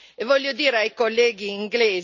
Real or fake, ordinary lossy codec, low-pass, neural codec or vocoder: real; none; 7.2 kHz; none